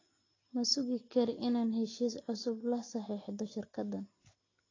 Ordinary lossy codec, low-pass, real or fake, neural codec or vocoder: AAC, 32 kbps; 7.2 kHz; real; none